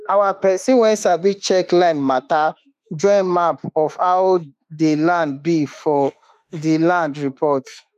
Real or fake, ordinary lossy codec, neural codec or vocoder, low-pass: fake; none; autoencoder, 48 kHz, 32 numbers a frame, DAC-VAE, trained on Japanese speech; 14.4 kHz